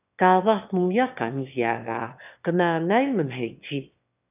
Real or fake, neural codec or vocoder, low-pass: fake; autoencoder, 22.05 kHz, a latent of 192 numbers a frame, VITS, trained on one speaker; 3.6 kHz